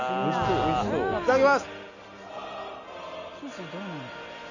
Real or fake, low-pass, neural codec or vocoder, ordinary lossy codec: real; 7.2 kHz; none; none